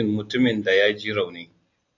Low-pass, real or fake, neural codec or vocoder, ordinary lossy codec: 7.2 kHz; real; none; AAC, 48 kbps